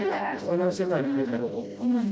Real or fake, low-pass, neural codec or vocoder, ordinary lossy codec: fake; none; codec, 16 kHz, 0.5 kbps, FreqCodec, smaller model; none